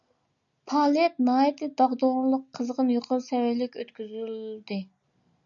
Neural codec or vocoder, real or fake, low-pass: none; real; 7.2 kHz